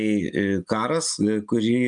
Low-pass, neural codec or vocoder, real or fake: 9.9 kHz; none; real